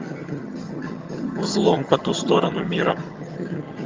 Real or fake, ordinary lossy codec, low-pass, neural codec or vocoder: fake; Opus, 32 kbps; 7.2 kHz; vocoder, 22.05 kHz, 80 mel bands, HiFi-GAN